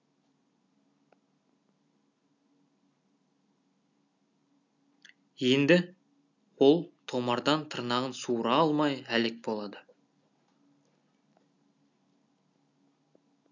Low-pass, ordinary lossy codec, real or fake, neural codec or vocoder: 7.2 kHz; none; real; none